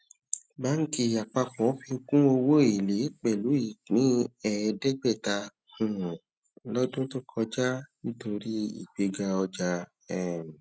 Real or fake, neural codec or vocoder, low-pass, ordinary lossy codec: real; none; none; none